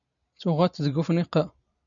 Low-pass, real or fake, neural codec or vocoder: 7.2 kHz; real; none